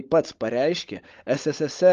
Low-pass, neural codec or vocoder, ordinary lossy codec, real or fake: 7.2 kHz; codec, 16 kHz, 16 kbps, FunCodec, trained on LibriTTS, 50 frames a second; Opus, 24 kbps; fake